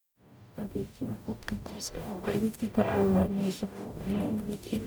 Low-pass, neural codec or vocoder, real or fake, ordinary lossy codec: none; codec, 44.1 kHz, 0.9 kbps, DAC; fake; none